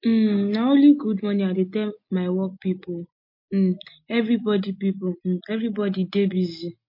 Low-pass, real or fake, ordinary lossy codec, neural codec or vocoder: 5.4 kHz; real; MP3, 32 kbps; none